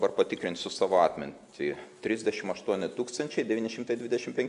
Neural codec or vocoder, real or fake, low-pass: none; real; 10.8 kHz